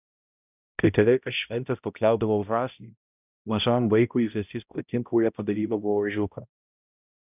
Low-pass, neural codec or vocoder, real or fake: 3.6 kHz; codec, 16 kHz, 0.5 kbps, X-Codec, HuBERT features, trained on balanced general audio; fake